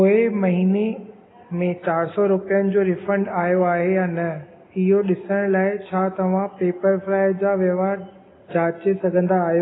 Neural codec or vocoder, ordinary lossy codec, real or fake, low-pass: none; AAC, 16 kbps; real; 7.2 kHz